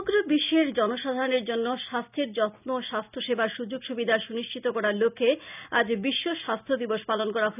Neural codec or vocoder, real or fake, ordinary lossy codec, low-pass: none; real; none; 3.6 kHz